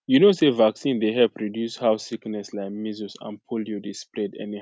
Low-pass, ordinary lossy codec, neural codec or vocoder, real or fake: none; none; none; real